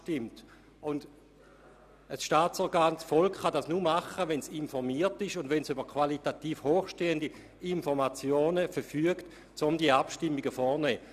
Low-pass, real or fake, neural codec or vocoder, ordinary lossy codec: 14.4 kHz; real; none; none